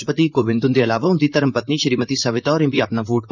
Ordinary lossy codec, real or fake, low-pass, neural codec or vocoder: none; fake; 7.2 kHz; vocoder, 44.1 kHz, 128 mel bands, Pupu-Vocoder